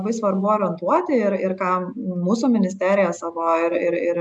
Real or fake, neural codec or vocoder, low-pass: real; none; 10.8 kHz